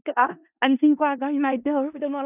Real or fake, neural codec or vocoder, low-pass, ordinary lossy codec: fake; codec, 16 kHz in and 24 kHz out, 0.4 kbps, LongCat-Audio-Codec, four codebook decoder; 3.6 kHz; none